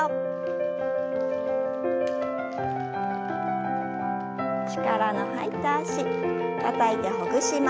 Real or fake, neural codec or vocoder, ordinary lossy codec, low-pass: real; none; none; none